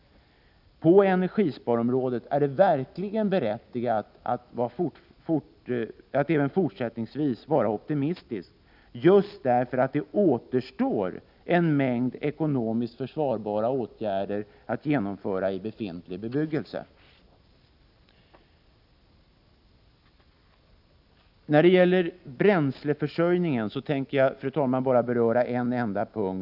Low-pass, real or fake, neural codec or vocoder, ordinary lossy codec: 5.4 kHz; real; none; none